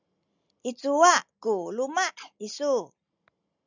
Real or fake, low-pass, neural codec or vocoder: real; 7.2 kHz; none